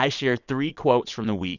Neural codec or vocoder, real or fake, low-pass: vocoder, 22.05 kHz, 80 mel bands, WaveNeXt; fake; 7.2 kHz